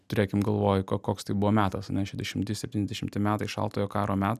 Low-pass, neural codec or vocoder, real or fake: 14.4 kHz; none; real